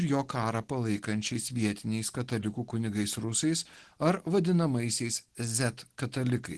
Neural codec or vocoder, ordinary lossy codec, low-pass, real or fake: none; Opus, 16 kbps; 10.8 kHz; real